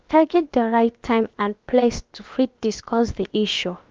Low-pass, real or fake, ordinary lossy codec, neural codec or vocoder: 7.2 kHz; fake; Opus, 24 kbps; codec, 16 kHz, about 1 kbps, DyCAST, with the encoder's durations